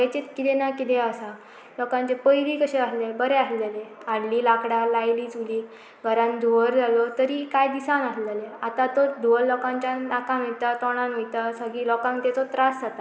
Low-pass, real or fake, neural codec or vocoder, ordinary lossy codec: none; real; none; none